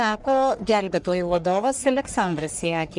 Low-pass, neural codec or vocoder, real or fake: 10.8 kHz; codec, 44.1 kHz, 1.7 kbps, Pupu-Codec; fake